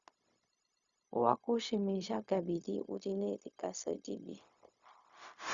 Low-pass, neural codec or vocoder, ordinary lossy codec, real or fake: 7.2 kHz; codec, 16 kHz, 0.4 kbps, LongCat-Audio-Codec; Opus, 64 kbps; fake